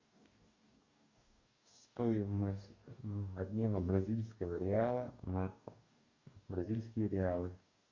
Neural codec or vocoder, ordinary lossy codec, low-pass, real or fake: codec, 44.1 kHz, 2.6 kbps, DAC; Opus, 64 kbps; 7.2 kHz; fake